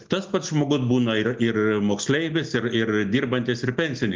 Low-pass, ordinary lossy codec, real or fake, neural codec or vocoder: 7.2 kHz; Opus, 32 kbps; real; none